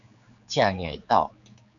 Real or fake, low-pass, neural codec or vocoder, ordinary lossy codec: fake; 7.2 kHz; codec, 16 kHz, 4 kbps, X-Codec, HuBERT features, trained on general audio; MP3, 96 kbps